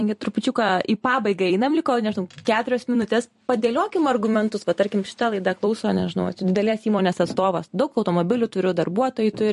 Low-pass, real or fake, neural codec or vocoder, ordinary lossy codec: 14.4 kHz; fake; vocoder, 44.1 kHz, 128 mel bands every 256 samples, BigVGAN v2; MP3, 48 kbps